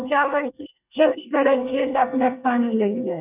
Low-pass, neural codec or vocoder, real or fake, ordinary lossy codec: 3.6 kHz; codec, 24 kHz, 1 kbps, SNAC; fake; none